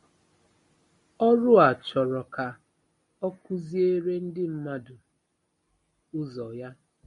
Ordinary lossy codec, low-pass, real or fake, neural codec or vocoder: MP3, 48 kbps; 10.8 kHz; real; none